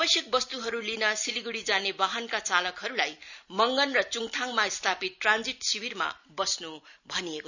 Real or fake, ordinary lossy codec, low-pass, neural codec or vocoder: real; none; 7.2 kHz; none